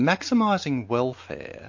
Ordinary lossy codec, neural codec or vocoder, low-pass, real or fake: MP3, 48 kbps; none; 7.2 kHz; real